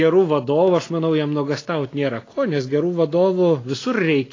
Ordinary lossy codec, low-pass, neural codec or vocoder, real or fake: AAC, 32 kbps; 7.2 kHz; none; real